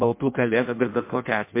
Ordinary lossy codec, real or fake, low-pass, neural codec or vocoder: MP3, 24 kbps; fake; 3.6 kHz; codec, 16 kHz in and 24 kHz out, 0.6 kbps, FireRedTTS-2 codec